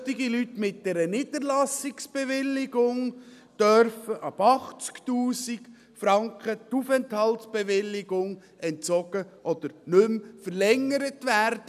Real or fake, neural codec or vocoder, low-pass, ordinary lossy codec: real; none; 14.4 kHz; none